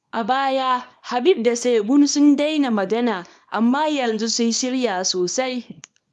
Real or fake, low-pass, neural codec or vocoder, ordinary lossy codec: fake; none; codec, 24 kHz, 0.9 kbps, WavTokenizer, small release; none